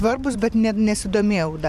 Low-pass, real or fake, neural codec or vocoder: 14.4 kHz; fake; vocoder, 44.1 kHz, 128 mel bands every 512 samples, BigVGAN v2